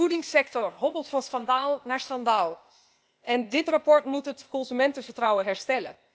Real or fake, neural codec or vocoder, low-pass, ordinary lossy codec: fake; codec, 16 kHz, 0.8 kbps, ZipCodec; none; none